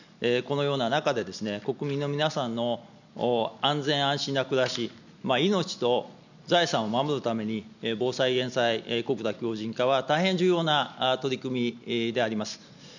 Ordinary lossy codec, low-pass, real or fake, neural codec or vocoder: none; 7.2 kHz; real; none